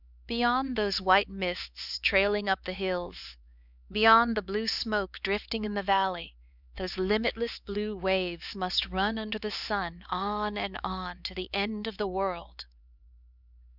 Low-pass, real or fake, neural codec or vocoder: 5.4 kHz; fake; codec, 16 kHz, 4 kbps, X-Codec, HuBERT features, trained on LibriSpeech